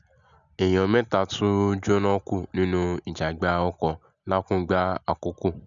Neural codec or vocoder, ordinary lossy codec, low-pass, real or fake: none; none; 7.2 kHz; real